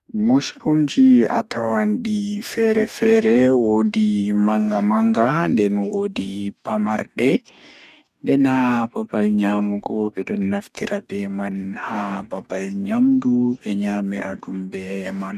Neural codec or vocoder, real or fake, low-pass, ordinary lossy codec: codec, 44.1 kHz, 2.6 kbps, DAC; fake; 14.4 kHz; none